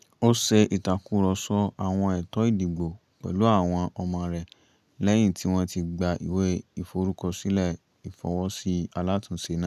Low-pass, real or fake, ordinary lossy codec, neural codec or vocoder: 14.4 kHz; real; none; none